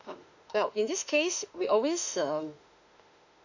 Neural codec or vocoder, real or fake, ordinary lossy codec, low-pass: autoencoder, 48 kHz, 32 numbers a frame, DAC-VAE, trained on Japanese speech; fake; none; 7.2 kHz